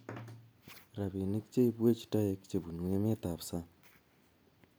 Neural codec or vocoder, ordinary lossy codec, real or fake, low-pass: none; none; real; none